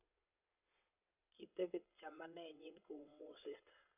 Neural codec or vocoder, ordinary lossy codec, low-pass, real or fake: vocoder, 22.05 kHz, 80 mel bands, WaveNeXt; none; 3.6 kHz; fake